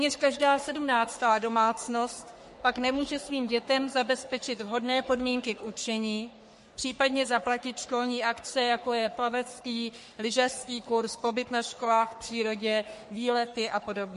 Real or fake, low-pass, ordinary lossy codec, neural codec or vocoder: fake; 14.4 kHz; MP3, 48 kbps; codec, 44.1 kHz, 3.4 kbps, Pupu-Codec